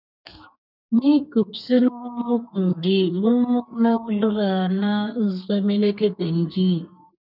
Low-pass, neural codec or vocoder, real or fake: 5.4 kHz; codec, 32 kHz, 1.9 kbps, SNAC; fake